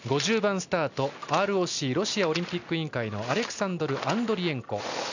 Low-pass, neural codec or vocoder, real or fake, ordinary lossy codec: 7.2 kHz; none; real; none